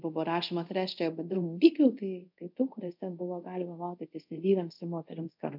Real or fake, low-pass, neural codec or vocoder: fake; 5.4 kHz; codec, 24 kHz, 0.5 kbps, DualCodec